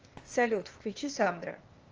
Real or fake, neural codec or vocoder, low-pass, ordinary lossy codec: fake; codec, 16 kHz, 0.8 kbps, ZipCodec; 7.2 kHz; Opus, 24 kbps